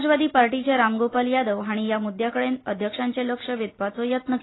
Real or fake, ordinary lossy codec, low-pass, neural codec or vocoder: real; AAC, 16 kbps; 7.2 kHz; none